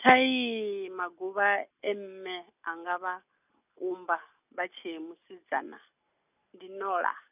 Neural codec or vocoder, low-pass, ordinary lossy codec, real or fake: none; 3.6 kHz; none; real